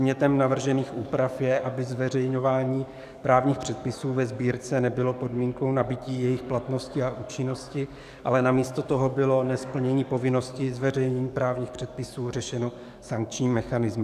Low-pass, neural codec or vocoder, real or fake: 14.4 kHz; codec, 44.1 kHz, 7.8 kbps, DAC; fake